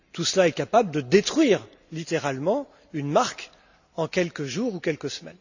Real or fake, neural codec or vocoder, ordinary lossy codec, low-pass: real; none; none; 7.2 kHz